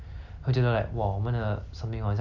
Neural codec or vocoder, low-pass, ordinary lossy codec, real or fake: none; 7.2 kHz; none; real